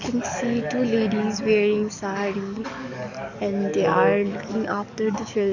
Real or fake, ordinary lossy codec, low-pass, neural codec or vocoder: fake; none; 7.2 kHz; codec, 44.1 kHz, 7.8 kbps, DAC